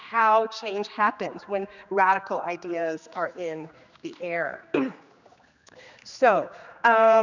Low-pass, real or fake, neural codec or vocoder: 7.2 kHz; fake; codec, 16 kHz, 2 kbps, X-Codec, HuBERT features, trained on general audio